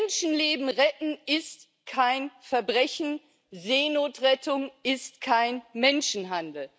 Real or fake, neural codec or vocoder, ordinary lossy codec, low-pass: real; none; none; none